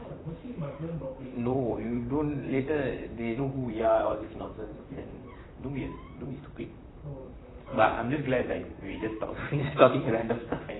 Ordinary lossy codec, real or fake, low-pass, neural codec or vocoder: AAC, 16 kbps; fake; 7.2 kHz; vocoder, 44.1 kHz, 128 mel bands, Pupu-Vocoder